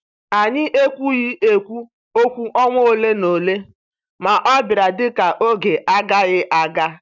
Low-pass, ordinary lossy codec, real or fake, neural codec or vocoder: 7.2 kHz; none; real; none